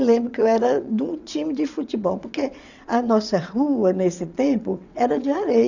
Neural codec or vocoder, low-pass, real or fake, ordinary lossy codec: vocoder, 22.05 kHz, 80 mel bands, WaveNeXt; 7.2 kHz; fake; none